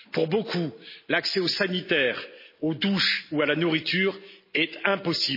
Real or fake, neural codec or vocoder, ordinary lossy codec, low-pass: real; none; MP3, 24 kbps; 5.4 kHz